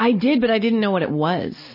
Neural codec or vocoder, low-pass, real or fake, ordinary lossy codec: none; 5.4 kHz; real; MP3, 24 kbps